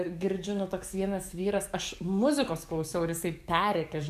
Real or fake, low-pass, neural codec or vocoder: fake; 14.4 kHz; codec, 44.1 kHz, 7.8 kbps, DAC